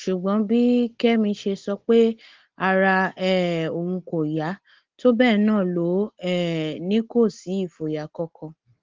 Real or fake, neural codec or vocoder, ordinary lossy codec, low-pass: real; none; Opus, 16 kbps; 7.2 kHz